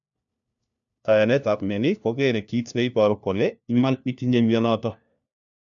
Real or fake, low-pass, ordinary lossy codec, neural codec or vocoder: fake; 7.2 kHz; none; codec, 16 kHz, 1 kbps, FunCodec, trained on LibriTTS, 50 frames a second